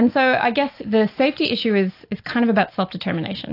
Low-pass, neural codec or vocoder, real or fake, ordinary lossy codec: 5.4 kHz; none; real; AAC, 32 kbps